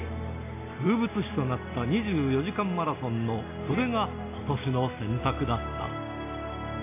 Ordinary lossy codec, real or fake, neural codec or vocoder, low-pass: AAC, 24 kbps; real; none; 3.6 kHz